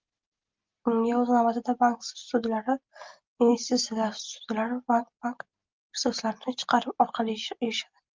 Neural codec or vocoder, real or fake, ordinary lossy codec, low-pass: none; real; Opus, 24 kbps; 7.2 kHz